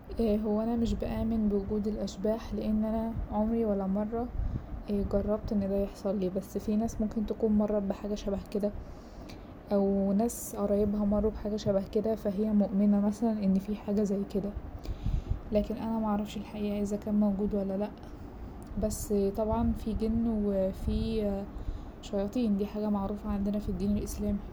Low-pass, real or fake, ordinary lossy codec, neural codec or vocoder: none; real; none; none